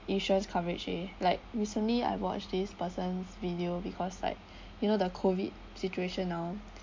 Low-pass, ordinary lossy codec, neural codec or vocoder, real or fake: 7.2 kHz; MP3, 48 kbps; none; real